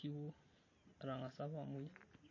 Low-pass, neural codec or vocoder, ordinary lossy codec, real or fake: 7.2 kHz; none; MP3, 32 kbps; real